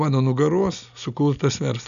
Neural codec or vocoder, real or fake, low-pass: none; real; 7.2 kHz